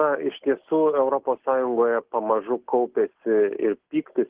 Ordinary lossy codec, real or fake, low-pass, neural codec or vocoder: Opus, 16 kbps; real; 3.6 kHz; none